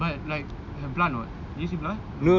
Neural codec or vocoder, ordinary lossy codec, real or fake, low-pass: none; none; real; 7.2 kHz